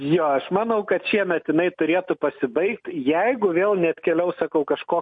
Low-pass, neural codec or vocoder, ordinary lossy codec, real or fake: 9.9 kHz; none; MP3, 48 kbps; real